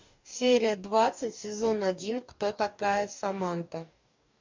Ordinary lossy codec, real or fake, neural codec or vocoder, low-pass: MP3, 64 kbps; fake; codec, 44.1 kHz, 2.6 kbps, DAC; 7.2 kHz